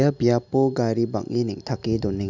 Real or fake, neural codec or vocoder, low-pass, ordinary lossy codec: real; none; 7.2 kHz; none